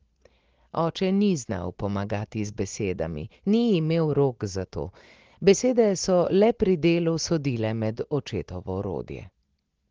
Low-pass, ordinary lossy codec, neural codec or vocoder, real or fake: 7.2 kHz; Opus, 16 kbps; none; real